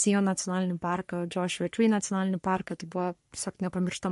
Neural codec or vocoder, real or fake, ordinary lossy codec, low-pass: codec, 44.1 kHz, 3.4 kbps, Pupu-Codec; fake; MP3, 48 kbps; 14.4 kHz